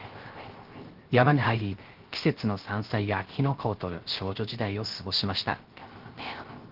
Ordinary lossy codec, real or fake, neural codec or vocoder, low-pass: Opus, 16 kbps; fake; codec, 16 kHz, 0.3 kbps, FocalCodec; 5.4 kHz